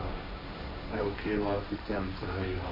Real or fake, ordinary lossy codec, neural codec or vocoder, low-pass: fake; MP3, 24 kbps; codec, 16 kHz, 1.1 kbps, Voila-Tokenizer; 5.4 kHz